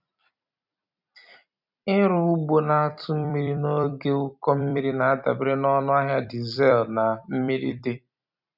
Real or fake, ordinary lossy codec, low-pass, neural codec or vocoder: fake; none; 5.4 kHz; vocoder, 44.1 kHz, 128 mel bands every 256 samples, BigVGAN v2